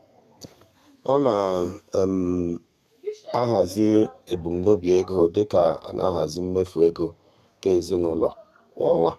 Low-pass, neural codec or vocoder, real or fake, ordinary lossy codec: 14.4 kHz; codec, 32 kHz, 1.9 kbps, SNAC; fake; none